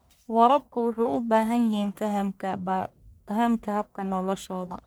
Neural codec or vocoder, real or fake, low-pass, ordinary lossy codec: codec, 44.1 kHz, 1.7 kbps, Pupu-Codec; fake; none; none